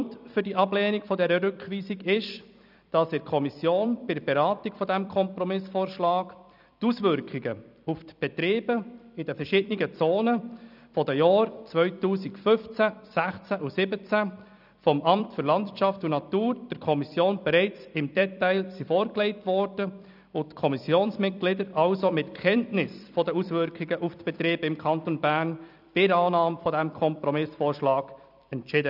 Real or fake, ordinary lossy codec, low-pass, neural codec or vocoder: fake; none; 5.4 kHz; vocoder, 44.1 kHz, 128 mel bands every 256 samples, BigVGAN v2